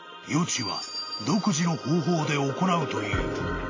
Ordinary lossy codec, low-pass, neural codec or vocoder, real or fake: AAC, 32 kbps; 7.2 kHz; none; real